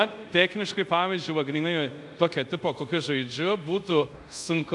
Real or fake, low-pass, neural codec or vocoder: fake; 10.8 kHz; codec, 24 kHz, 0.5 kbps, DualCodec